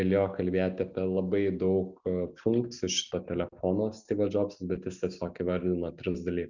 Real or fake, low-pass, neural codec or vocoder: real; 7.2 kHz; none